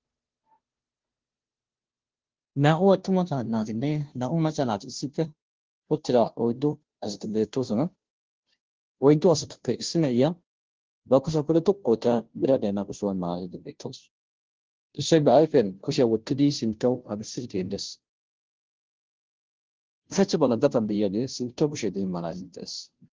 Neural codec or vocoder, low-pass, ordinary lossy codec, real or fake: codec, 16 kHz, 0.5 kbps, FunCodec, trained on Chinese and English, 25 frames a second; 7.2 kHz; Opus, 16 kbps; fake